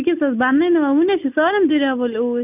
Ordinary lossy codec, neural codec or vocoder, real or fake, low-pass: none; none; real; 3.6 kHz